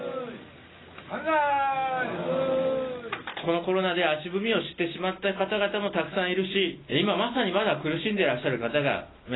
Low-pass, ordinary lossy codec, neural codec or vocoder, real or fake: 7.2 kHz; AAC, 16 kbps; none; real